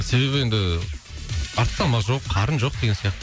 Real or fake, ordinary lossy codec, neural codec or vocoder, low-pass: real; none; none; none